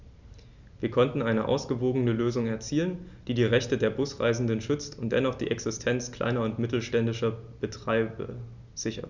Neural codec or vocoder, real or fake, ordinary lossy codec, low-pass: none; real; none; 7.2 kHz